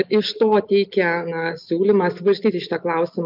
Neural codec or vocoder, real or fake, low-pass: none; real; 5.4 kHz